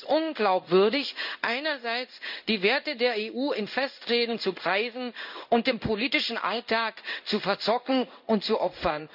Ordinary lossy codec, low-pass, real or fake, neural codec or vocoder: none; 5.4 kHz; fake; codec, 16 kHz in and 24 kHz out, 1 kbps, XY-Tokenizer